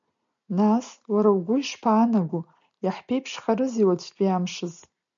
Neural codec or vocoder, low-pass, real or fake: none; 7.2 kHz; real